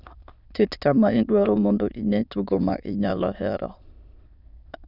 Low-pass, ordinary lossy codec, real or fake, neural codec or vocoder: 5.4 kHz; none; fake; autoencoder, 22.05 kHz, a latent of 192 numbers a frame, VITS, trained on many speakers